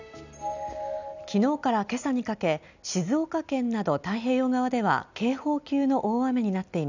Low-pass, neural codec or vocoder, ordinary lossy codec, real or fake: 7.2 kHz; none; none; real